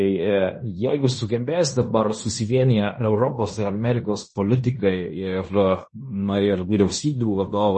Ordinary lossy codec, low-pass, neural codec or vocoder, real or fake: MP3, 32 kbps; 10.8 kHz; codec, 16 kHz in and 24 kHz out, 0.9 kbps, LongCat-Audio-Codec, fine tuned four codebook decoder; fake